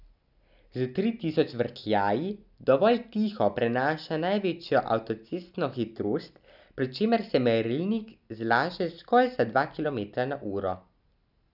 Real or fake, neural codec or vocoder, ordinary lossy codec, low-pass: real; none; none; 5.4 kHz